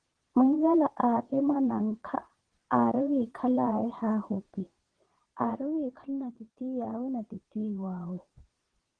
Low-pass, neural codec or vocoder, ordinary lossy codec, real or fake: 9.9 kHz; vocoder, 22.05 kHz, 80 mel bands, Vocos; Opus, 16 kbps; fake